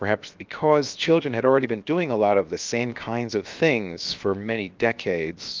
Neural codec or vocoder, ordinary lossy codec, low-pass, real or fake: codec, 16 kHz, 0.7 kbps, FocalCodec; Opus, 24 kbps; 7.2 kHz; fake